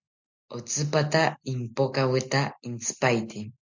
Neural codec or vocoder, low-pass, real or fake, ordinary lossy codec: none; 7.2 kHz; real; MP3, 64 kbps